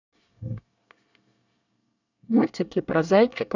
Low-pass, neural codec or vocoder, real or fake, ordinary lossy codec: 7.2 kHz; codec, 24 kHz, 1 kbps, SNAC; fake; none